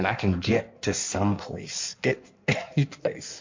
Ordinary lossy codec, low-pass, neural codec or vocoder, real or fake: MP3, 48 kbps; 7.2 kHz; codec, 16 kHz in and 24 kHz out, 1.1 kbps, FireRedTTS-2 codec; fake